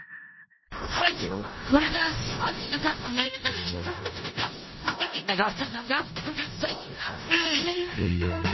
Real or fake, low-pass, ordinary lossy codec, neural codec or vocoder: fake; 7.2 kHz; MP3, 24 kbps; codec, 16 kHz in and 24 kHz out, 0.4 kbps, LongCat-Audio-Codec, four codebook decoder